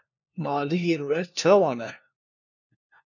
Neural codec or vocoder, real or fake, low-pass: codec, 16 kHz, 1 kbps, FunCodec, trained on LibriTTS, 50 frames a second; fake; 7.2 kHz